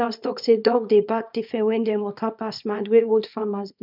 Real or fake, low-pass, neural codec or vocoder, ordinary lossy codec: fake; 5.4 kHz; codec, 24 kHz, 0.9 kbps, WavTokenizer, small release; none